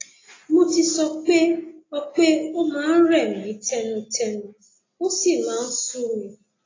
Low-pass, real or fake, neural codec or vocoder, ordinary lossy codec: 7.2 kHz; real; none; AAC, 32 kbps